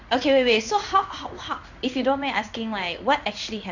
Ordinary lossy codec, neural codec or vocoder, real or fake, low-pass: none; codec, 16 kHz in and 24 kHz out, 1 kbps, XY-Tokenizer; fake; 7.2 kHz